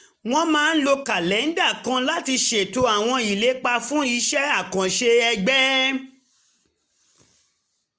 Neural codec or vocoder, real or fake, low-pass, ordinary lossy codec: none; real; none; none